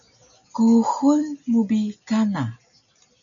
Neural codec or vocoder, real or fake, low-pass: none; real; 7.2 kHz